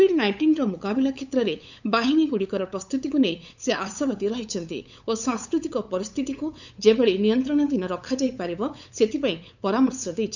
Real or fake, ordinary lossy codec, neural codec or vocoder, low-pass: fake; none; codec, 16 kHz, 8 kbps, FunCodec, trained on LibriTTS, 25 frames a second; 7.2 kHz